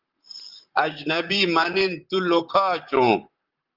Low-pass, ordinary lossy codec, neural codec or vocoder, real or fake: 5.4 kHz; Opus, 32 kbps; vocoder, 44.1 kHz, 128 mel bands, Pupu-Vocoder; fake